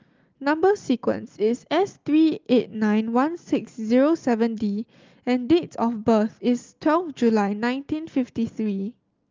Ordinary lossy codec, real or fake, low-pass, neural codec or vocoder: Opus, 24 kbps; real; 7.2 kHz; none